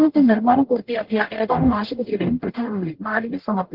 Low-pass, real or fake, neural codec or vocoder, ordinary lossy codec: 5.4 kHz; fake; codec, 44.1 kHz, 0.9 kbps, DAC; Opus, 16 kbps